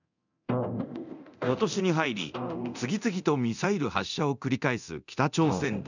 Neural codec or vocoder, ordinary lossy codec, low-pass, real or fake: codec, 24 kHz, 0.9 kbps, DualCodec; none; 7.2 kHz; fake